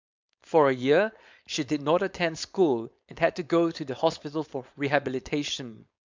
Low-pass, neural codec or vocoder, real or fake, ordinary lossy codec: 7.2 kHz; codec, 16 kHz, 4.8 kbps, FACodec; fake; MP3, 64 kbps